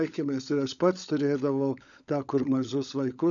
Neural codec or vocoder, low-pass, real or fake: codec, 16 kHz, 16 kbps, FunCodec, trained on LibriTTS, 50 frames a second; 7.2 kHz; fake